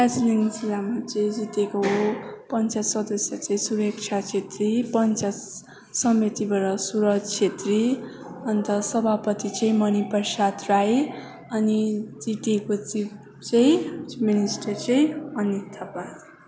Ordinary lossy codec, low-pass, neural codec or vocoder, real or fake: none; none; none; real